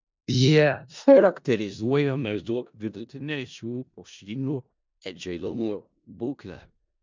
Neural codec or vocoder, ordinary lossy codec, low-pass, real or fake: codec, 16 kHz in and 24 kHz out, 0.4 kbps, LongCat-Audio-Codec, four codebook decoder; MP3, 64 kbps; 7.2 kHz; fake